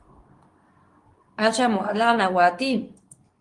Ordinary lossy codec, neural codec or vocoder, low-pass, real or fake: Opus, 24 kbps; codec, 24 kHz, 0.9 kbps, WavTokenizer, medium speech release version 2; 10.8 kHz; fake